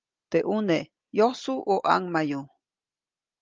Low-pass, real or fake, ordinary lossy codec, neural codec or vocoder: 7.2 kHz; real; Opus, 24 kbps; none